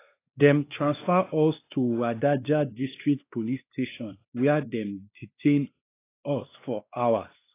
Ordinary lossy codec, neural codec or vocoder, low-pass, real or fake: AAC, 24 kbps; codec, 16 kHz, 2 kbps, X-Codec, WavLM features, trained on Multilingual LibriSpeech; 3.6 kHz; fake